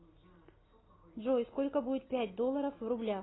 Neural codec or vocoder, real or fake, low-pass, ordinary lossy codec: none; real; 7.2 kHz; AAC, 16 kbps